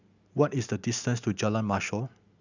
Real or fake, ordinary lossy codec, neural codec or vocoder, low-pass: real; none; none; 7.2 kHz